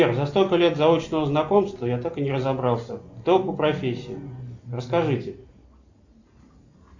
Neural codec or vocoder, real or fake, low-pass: none; real; 7.2 kHz